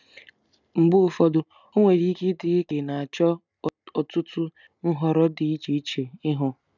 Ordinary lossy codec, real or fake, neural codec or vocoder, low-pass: none; real; none; 7.2 kHz